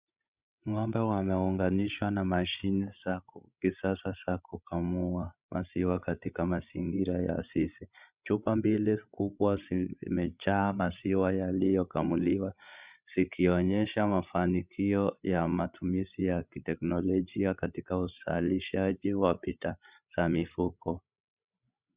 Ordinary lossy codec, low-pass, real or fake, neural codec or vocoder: Opus, 64 kbps; 3.6 kHz; fake; codec, 16 kHz, 16 kbps, FreqCodec, larger model